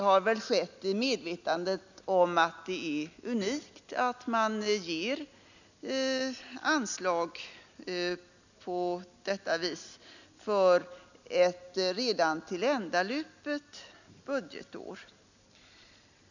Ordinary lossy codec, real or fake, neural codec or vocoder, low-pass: none; real; none; 7.2 kHz